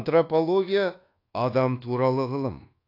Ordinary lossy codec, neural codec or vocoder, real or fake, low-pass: AAC, 32 kbps; codec, 24 kHz, 1.2 kbps, DualCodec; fake; 5.4 kHz